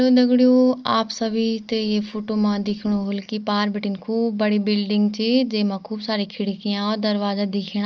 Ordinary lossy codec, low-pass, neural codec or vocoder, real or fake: Opus, 24 kbps; 7.2 kHz; none; real